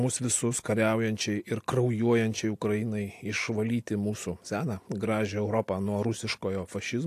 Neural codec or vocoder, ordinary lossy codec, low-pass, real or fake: none; AAC, 64 kbps; 14.4 kHz; real